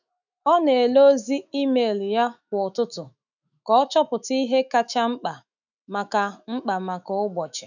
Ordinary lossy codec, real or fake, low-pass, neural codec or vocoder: none; fake; 7.2 kHz; autoencoder, 48 kHz, 128 numbers a frame, DAC-VAE, trained on Japanese speech